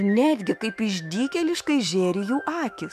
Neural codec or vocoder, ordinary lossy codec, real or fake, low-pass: autoencoder, 48 kHz, 128 numbers a frame, DAC-VAE, trained on Japanese speech; MP3, 96 kbps; fake; 14.4 kHz